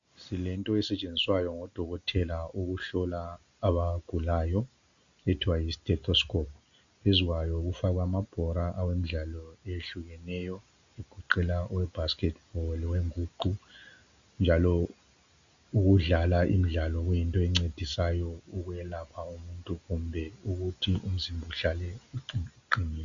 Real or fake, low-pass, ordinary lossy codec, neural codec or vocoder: real; 7.2 kHz; MP3, 64 kbps; none